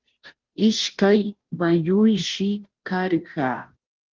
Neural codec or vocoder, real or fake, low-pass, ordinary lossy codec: codec, 16 kHz, 0.5 kbps, FunCodec, trained on Chinese and English, 25 frames a second; fake; 7.2 kHz; Opus, 16 kbps